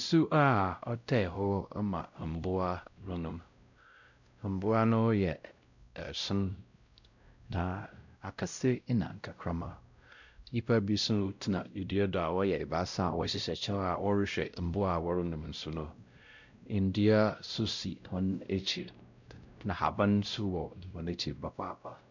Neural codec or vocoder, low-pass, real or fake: codec, 16 kHz, 0.5 kbps, X-Codec, WavLM features, trained on Multilingual LibriSpeech; 7.2 kHz; fake